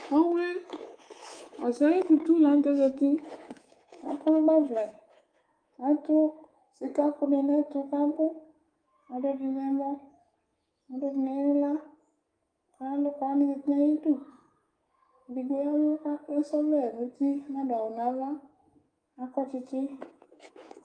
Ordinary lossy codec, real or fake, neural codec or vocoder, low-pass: Opus, 32 kbps; fake; codec, 24 kHz, 3.1 kbps, DualCodec; 9.9 kHz